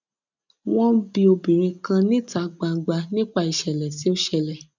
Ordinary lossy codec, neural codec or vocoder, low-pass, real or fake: none; none; 7.2 kHz; real